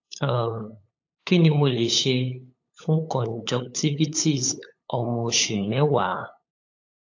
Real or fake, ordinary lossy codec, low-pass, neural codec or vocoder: fake; AAC, 48 kbps; 7.2 kHz; codec, 16 kHz, 8 kbps, FunCodec, trained on LibriTTS, 25 frames a second